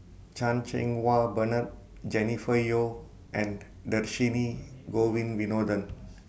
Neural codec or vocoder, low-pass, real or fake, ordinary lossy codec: none; none; real; none